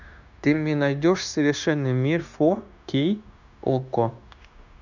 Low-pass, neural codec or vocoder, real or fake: 7.2 kHz; codec, 16 kHz, 0.9 kbps, LongCat-Audio-Codec; fake